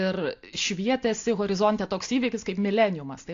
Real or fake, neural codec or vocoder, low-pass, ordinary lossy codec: real; none; 7.2 kHz; AAC, 48 kbps